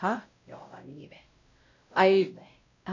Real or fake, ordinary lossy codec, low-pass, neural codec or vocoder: fake; AAC, 48 kbps; 7.2 kHz; codec, 16 kHz, 0.5 kbps, X-Codec, WavLM features, trained on Multilingual LibriSpeech